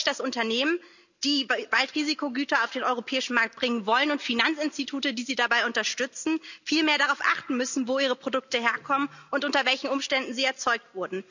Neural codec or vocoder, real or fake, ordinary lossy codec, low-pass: none; real; none; 7.2 kHz